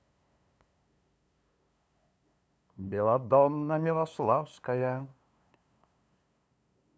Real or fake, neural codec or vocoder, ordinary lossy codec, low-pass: fake; codec, 16 kHz, 2 kbps, FunCodec, trained on LibriTTS, 25 frames a second; none; none